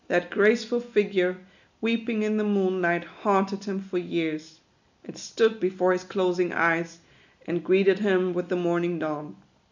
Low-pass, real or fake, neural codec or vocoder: 7.2 kHz; real; none